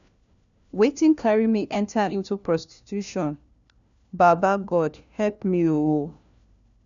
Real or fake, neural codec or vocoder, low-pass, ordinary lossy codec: fake; codec, 16 kHz, 1 kbps, FunCodec, trained on LibriTTS, 50 frames a second; 7.2 kHz; none